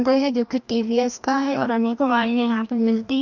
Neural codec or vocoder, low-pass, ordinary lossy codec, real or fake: codec, 16 kHz, 1 kbps, FreqCodec, larger model; 7.2 kHz; none; fake